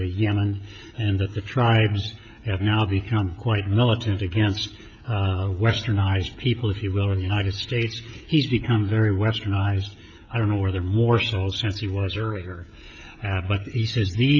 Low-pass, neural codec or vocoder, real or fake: 7.2 kHz; codec, 16 kHz, 16 kbps, FreqCodec, smaller model; fake